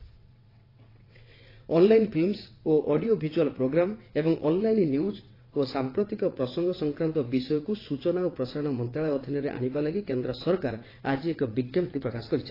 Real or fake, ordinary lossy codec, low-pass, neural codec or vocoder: fake; AAC, 24 kbps; 5.4 kHz; vocoder, 22.05 kHz, 80 mel bands, WaveNeXt